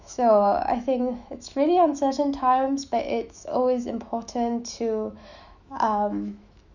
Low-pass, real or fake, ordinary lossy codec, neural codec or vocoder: 7.2 kHz; fake; none; autoencoder, 48 kHz, 128 numbers a frame, DAC-VAE, trained on Japanese speech